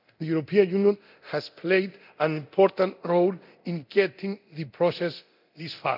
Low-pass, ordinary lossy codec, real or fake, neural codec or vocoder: 5.4 kHz; none; fake; codec, 24 kHz, 0.9 kbps, DualCodec